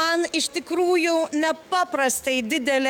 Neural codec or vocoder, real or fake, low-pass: codec, 44.1 kHz, 7.8 kbps, DAC; fake; 19.8 kHz